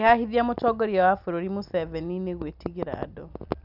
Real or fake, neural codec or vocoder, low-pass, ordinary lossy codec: real; none; 5.4 kHz; none